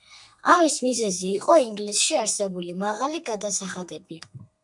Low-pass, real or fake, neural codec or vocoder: 10.8 kHz; fake; codec, 32 kHz, 1.9 kbps, SNAC